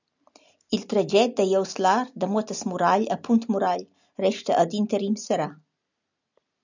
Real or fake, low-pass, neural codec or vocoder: real; 7.2 kHz; none